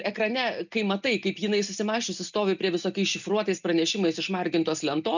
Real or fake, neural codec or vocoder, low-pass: real; none; 7.2 kHz